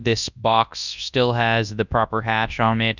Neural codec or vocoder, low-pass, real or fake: codec, 24 kHz, 0.9 kbps, WavTokenizer, large speech release; 7.2 kHz; fake